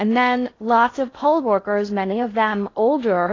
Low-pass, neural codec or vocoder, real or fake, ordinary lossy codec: 7.2 kHz; codec, 16 kHz in and 24 kHz out, 0.6 kbps, FocalCodec, streaming, 2048 codes; fake; AAC, 32 kbps